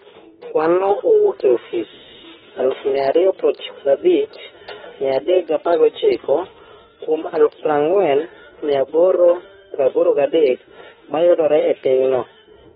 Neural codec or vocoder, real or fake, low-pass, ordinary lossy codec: autoencoder, 48 kHz, 32 numbers a frame, DAC-VAE, trained on Japanese speech; fake; 19.8 kHz; AAC, 16 kbps